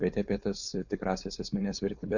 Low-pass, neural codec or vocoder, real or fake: 7.2 kHz; codec, 16 kHz, 4.8 kbps, FACodec; fake